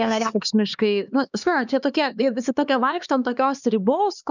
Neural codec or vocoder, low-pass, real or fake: codec, 16 kHz, 2 kbps, X-Codec, HuBERT features, trained on LibriSpeech; 7.2 kHz; fake